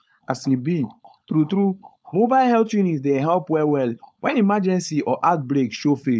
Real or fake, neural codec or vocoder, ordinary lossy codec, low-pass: fake; codec, 16 kHz, 4.8 kbps, FACodec; none; none